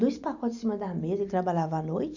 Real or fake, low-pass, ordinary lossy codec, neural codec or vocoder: real; 7.2 kHz; none; none